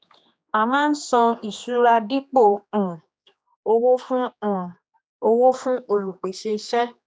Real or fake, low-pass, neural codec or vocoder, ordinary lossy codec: fake; none; codec, 16 kHz, 2 kbps, X-Codec, HuBERT features, trained on general audio; none